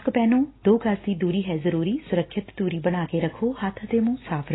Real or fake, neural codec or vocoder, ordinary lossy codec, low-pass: real; none; AAC, 16 kbps; 7.2 kHz